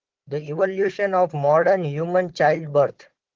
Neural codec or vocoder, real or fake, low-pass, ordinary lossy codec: codec, 16 kHz, 4 kbps, FunCodec, trained on Chinese and English, 50 frames a second; fake; 7.2 kHz; Opus, 16 kbps